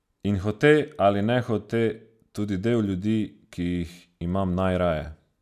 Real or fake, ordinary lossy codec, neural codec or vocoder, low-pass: real; none; none; 14.4 kHz